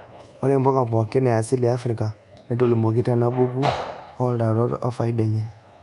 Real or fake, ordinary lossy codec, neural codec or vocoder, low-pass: fake; none; codec, 24 kHz, 1.2 kbps, DualCodec; 10.8 kHz